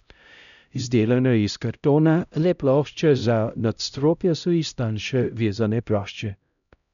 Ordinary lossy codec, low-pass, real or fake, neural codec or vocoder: none; 7.2 kHz; fake; codec, 16 kHz, 0.5 kbps, X-Codec, HuBERT features, trained on LibriSpeech